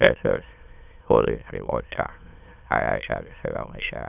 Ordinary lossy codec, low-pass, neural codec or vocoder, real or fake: none; 3.6 kHz; autoencoder, 22.05 kHz, a latent of 192 numbers a frame, VITS, trained on many speakers; fake